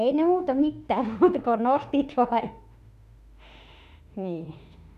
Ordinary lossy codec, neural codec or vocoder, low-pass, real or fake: MP3, 96 kbps; autoencoder, 48 kHz, 32 numbers a frame, DAC-VAE, trained on Japanese speech; 14.4 kHz; fake